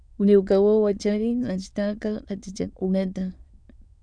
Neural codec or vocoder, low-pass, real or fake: autoencoder, 22.05 kHz, a latent of 192 numbers a frame, VITS, trained on many speakers; 9.9 kHz; fake